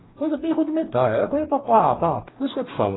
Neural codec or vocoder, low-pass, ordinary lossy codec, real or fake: codec, 44.1 kHz, 2.6 kbps, DAC; 7.2 kHz; AAC, 16 kbps; fake